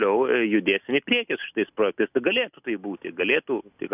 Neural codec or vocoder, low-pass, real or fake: none; 3.6 kHz; real